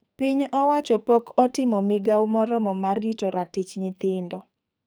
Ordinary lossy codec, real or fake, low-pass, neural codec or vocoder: none; fake; none; codec, 44.1 kHz, 2.6 kbps, SNAC